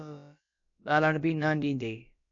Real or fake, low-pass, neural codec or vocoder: fake; 7.2 kHz; codec, 16 kHz, about 1 kbps, DyCAST, with the encoder's durations